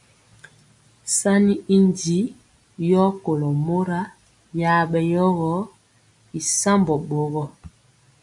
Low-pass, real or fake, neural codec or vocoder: 10.8 kHz; real; none